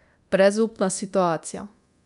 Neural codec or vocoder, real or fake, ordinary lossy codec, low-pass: codec, 24 kHz, 0.9 kbps, DualCodec; fake; none; 10.8 kHz